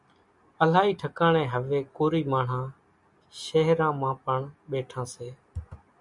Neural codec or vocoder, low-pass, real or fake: none; 10.8 kHz; real